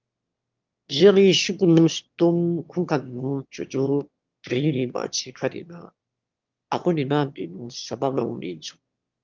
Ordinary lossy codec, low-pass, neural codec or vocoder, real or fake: Opus, 32 kbps; 7.2 kHz; autoencoder, 22.05 kHz, a latent of 192 numbers a frame, VITS, trained on one speaker; fake